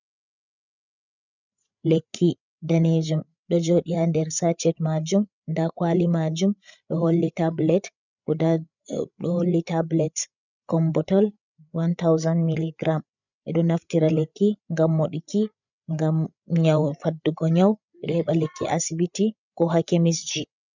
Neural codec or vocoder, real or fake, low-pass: codec, 16 kHz, 8 kbps, FreqCodec, larger model; fake; 7.2 kHz